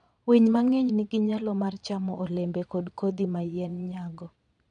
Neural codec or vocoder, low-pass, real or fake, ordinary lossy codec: vocoder, 22.05 kHz, 80 mel bands, Vocos; 9.9 kHz; fake; none